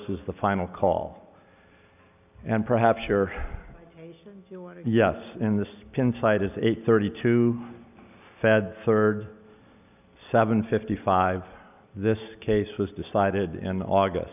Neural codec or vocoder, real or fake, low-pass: none; real; 3.6 kHz